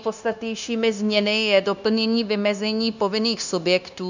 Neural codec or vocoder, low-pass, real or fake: codec, 16 kHz, 0.9 kbps, LongCat-Audio-Codec; 7.2 kHz; fake